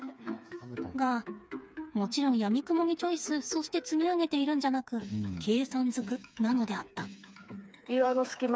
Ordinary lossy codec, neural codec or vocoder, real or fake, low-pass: none; codec, 16 kHz, 4 kbps, FreqCodec, smaller model; fake; none